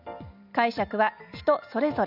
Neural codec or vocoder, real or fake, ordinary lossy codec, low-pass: none; real; none; 5.4 kHz